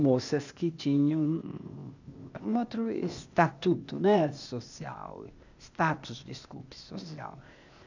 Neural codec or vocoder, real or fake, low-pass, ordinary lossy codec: codec, 16 kHz, 0.8 kbps, ZipCodec; fake; 7.2 kHz; none